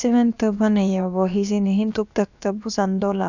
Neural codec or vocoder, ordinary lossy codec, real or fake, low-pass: codec, 16 kHz, about 1 kbps, DyCAST, with the encoder's durations; none; fake; 7.2 kHz